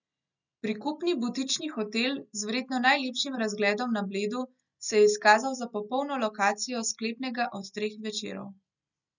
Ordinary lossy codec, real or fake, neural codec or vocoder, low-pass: none; real; none; 7.2 kHz